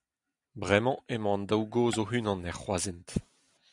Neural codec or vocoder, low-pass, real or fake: none; 10.8 kHz; real